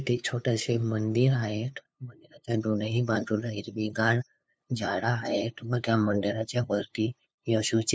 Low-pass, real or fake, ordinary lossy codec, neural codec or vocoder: none; fake; none; codec, 16 kHz, 2 kbps, FunCodec, trained on LibriTTS, 25 frames a second